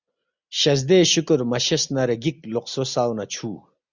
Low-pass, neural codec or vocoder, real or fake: 7.2 kHz; none; real